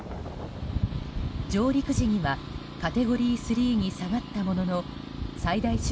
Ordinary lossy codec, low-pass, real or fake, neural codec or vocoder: none; none; real; none